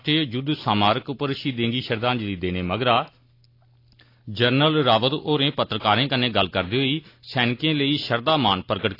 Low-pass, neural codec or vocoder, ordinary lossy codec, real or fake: 5.4 kHz; none; AAC, 32 kbps; real